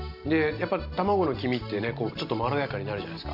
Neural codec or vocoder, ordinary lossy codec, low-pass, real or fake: none; none; 5.4 kHz; real